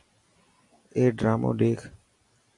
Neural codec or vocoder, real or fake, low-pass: none; real; 10.8 kHz